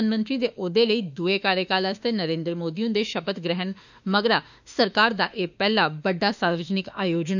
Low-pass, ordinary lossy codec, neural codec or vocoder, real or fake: 7.2 kHz; none; autoencoder, 48 kHz, 32 numbers a frame, DAC-VAE, trained on Japanese speech; fake